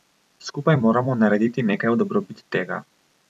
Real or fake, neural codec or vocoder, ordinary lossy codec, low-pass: fake; vocoder, 44.1 kHz, 128 mel bands every 256 samples, BigVGAN v2; none; 14.4 kHz